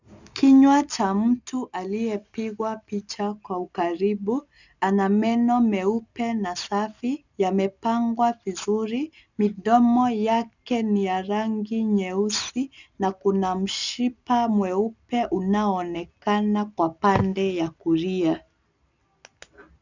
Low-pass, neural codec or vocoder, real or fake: 7.2 kHz; none; real